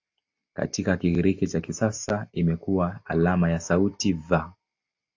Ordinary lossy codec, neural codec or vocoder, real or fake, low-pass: AAC, 48 kbps; none; real; 7.2 kHz